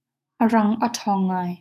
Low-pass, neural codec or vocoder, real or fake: 14.4 kHz; autoencoder, 48 kHz, 128 numbers a frame, DAC-VAE, trained on Japanese speech; fake